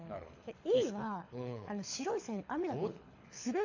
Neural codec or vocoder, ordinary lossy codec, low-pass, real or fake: codec, 24 kHz, 6 kbps, HILCodec; AAC, 48 kbps; 7.2 kHz; fake